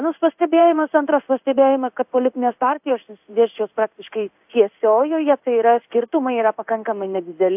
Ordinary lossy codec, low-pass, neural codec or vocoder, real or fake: AAC, 32 kbps; 3.6 kHz; codec, 16 kHz in and 24 kHz out, 1 kbps, XY-Tokenizer; fake